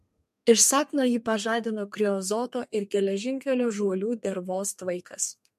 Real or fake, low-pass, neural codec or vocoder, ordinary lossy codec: fake; 14.4 kHz; codec, 32 kHz, 1.9 kbps, SNAC; MP3, 64 kbps